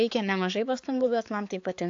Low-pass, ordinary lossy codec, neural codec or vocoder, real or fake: 7.2 kHz; AAC, 48 kbps; codec, 16 kHz, 4 kbps, X-Codec, HuBERT features, trained on balanced general audio; fake